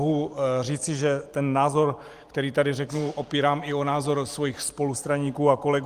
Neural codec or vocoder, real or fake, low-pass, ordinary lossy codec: vocoder, 44.1 kHz, 128 mel bands every 512 samples, BigVGAN v2; fake; 14.4 kHz; Opus, 24 kbps